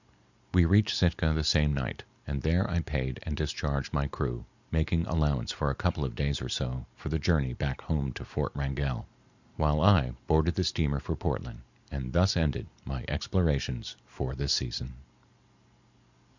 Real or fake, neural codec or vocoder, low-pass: real; none; 7.2 kHz